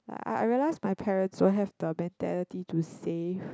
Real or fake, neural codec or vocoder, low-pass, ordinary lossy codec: real; none; none; none